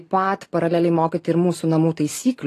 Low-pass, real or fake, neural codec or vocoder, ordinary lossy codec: 14.4 kHz; fake; vocoder, 44.1 kHz, 128 mel bands every 512 samples, BigVGAN v2; AAC, 48 kbps